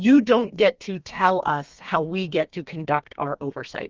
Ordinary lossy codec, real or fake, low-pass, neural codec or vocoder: Opus, 32 kbps; fake; 7.2 kHz; codec, 32 kHz, 1.9 kbps, SNAC